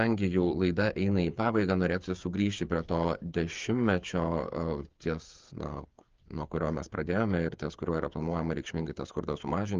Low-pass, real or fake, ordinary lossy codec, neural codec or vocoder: 7.2 kHz; fake; Opus, 24 kbps; codec, 16 kHz, 8 kbps, FreqCodec, smaller model